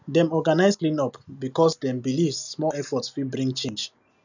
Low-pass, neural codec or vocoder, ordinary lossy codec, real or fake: 7.2 kHz; none; none; real